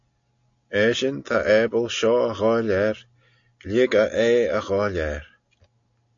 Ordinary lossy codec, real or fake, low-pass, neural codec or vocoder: AAC, 64 kbps; real; 7.2 kHz; none